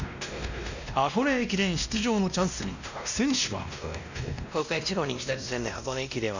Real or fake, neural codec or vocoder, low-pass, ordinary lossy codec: fake; codec, 16 kHz, 1 kbps, X-Codec, WavLM features, trained on Multilingual LibriSpeech; 7.2 kHz; none